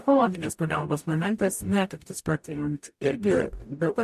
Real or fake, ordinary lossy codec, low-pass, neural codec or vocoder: fake; MP3, 64 kbps; 14.4 kHz; codec, 44.1 kHz, 0.9 kbps, DAC